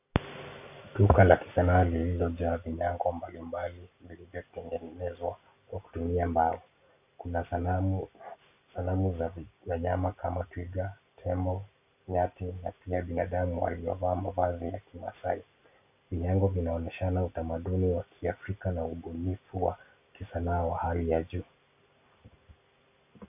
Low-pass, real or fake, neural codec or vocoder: 3.6 kHz; real; none